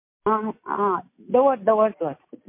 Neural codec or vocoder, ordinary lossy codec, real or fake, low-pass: none; MP3, 24 kbps; real; 3.6 kHz